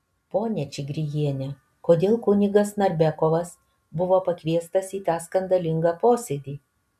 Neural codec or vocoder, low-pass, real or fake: none; 14.4 kHz; real